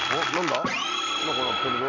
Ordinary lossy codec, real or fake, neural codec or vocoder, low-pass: none; real; none; 7.2 kHz